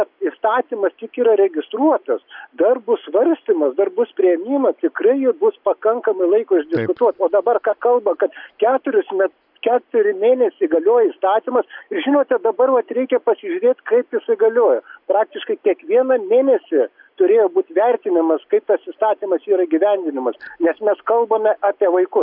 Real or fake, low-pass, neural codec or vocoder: real; 5.4 kHz; none